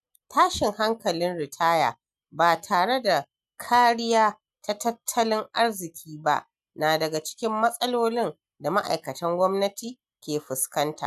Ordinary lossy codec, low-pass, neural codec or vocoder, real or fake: none; 14.4 kHz; none; real